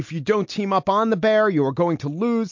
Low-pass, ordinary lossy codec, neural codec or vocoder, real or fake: 7.2 kHz; MP3, 48 kbps; none; real